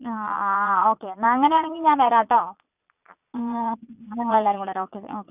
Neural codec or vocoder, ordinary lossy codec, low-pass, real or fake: vocoder, 22.05 kHz, 80 mel bands, Vocos; none; 3.6 kHz; fake